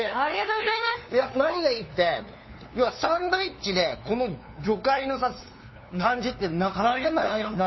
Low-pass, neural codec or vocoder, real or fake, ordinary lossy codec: 7.2 kHz; codec, 16 kHz, 2 kbps, FunCodec, trained on LibriTTS, 25 frames a second; fake; MP3, 24 kbps